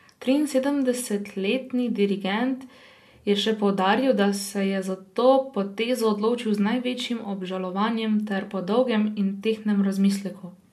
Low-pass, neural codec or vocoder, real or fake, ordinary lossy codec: 14.4 kHz; none; real; MP3, 64 kbps